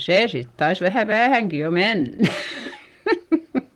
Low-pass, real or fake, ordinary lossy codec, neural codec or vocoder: 19.8 kHz; fake; Opus, 24 kbps; vocoder, 44.1 kHz, 128 mel bands, Pupu-Vocoder